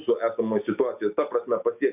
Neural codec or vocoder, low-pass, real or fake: none; 3.6 kHz; real